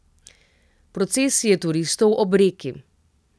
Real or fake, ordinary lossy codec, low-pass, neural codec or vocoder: real; none; none; none